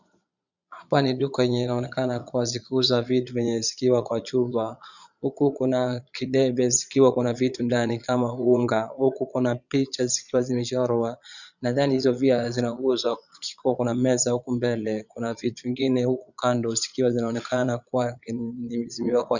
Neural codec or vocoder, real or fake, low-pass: vocoder, 22.05 kHz, 80 mel bands, Vocos; fake; 7.2 kHz